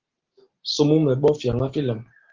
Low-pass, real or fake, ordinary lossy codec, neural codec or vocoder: 7.2 kHz; real; Opus, 16 kbps; none